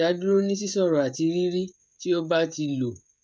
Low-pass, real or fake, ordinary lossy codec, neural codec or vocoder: none; fake; none; codec, 16 kHz, 16 kbps, FreqCodec, smaller model